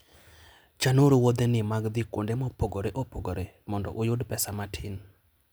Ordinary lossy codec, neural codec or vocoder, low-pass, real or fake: none; none; none; real